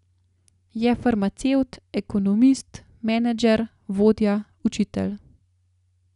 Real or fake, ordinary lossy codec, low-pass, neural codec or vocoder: real; none; 10.8 kHz; none